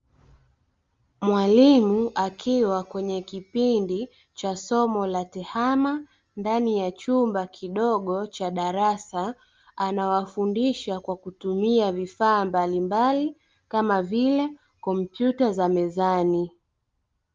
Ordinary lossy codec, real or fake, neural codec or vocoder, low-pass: Opus, 32 kbps; real; none; 7.2 kHz